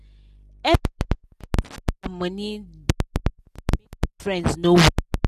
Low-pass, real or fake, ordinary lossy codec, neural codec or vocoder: 14.4 kHz; real; none; none